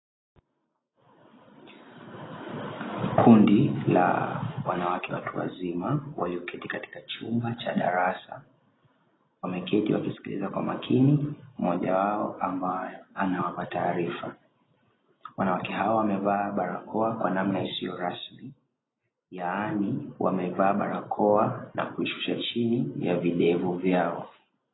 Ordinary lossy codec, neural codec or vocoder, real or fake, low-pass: AAC, 16 kbps; none; real; 7.2 kHz